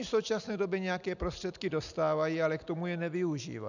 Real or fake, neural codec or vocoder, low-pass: real; none; 7.2 kHz